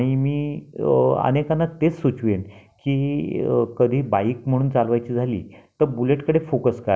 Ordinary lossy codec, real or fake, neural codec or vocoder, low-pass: none; real; none; none